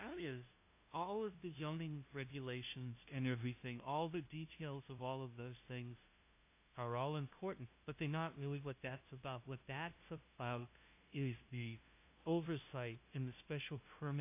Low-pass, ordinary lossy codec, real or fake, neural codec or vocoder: 3.6 kHz; AAC, 24 kbps; fake; codec, 16 kHz, 0.5 kbps, FunCodec, trained on LibriTTS, 25 frames a second